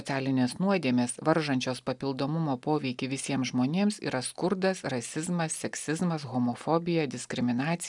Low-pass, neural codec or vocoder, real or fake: 10.8 kHz; none; real